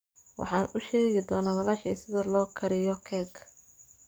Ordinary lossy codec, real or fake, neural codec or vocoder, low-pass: none; fake; codec, 44.1 kHz, 7.8 kbps, DAC; none